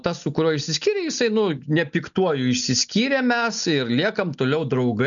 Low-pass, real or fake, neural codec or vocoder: 7.2 kHz; real; none